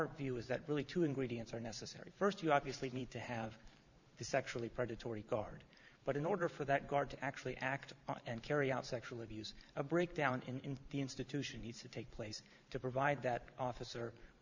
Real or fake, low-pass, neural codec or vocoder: fake; 7.2 kHz; vocoder, 44.1 kHz, 128 mel bands every 512 samples, BigVGAN v2